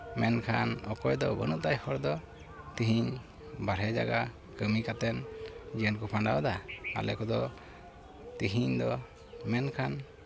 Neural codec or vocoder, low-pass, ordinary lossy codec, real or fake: none; none; none; real